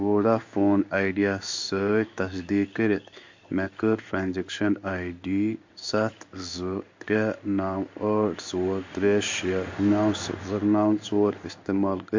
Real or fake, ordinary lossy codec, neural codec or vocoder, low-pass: fake; MP3, 48 kbps; codec, 16 kHz in and 24 kHz out, 1 kbps, XY-Tokenizer; 7.2 kHz